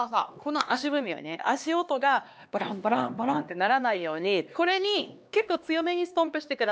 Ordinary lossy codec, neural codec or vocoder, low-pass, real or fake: none; codec, 16 kHz, 2 kbps, X-Codec, HuBERT features, trained on LibriSpeech; none; fake